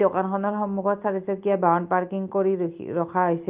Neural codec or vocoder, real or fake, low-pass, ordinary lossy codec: none; real; 3.6 kHz; Opus, 32 kbps